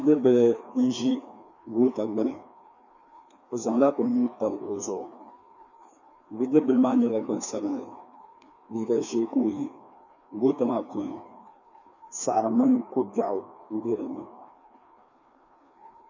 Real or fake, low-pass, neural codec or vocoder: fake; 7.2 kHz; codec, 16 kHz, 2 kbps, FreqCodec, larger model